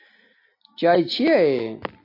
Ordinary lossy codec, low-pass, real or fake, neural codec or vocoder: AAC, 32 kbps; 5.4 kHz; real; none